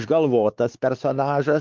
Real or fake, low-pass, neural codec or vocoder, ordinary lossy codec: fake; 7.2 kHz; codec, 16 kHz, 4 kbps, X-Codec, WavLM features, trained on Multilingual LibriSpeech; Opus, 24 kbps